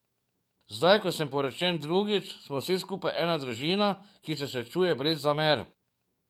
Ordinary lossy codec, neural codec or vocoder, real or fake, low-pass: MP3, 96 kbps; codec, 44.1 kHz, 7.8 kbps, DAC; fake; 19.8 kHz